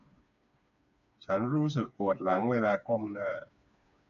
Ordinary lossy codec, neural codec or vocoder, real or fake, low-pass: none; codec, 16 kHz, 4 kbps, FreqCodec, smaller model; fake; 7.2 kHz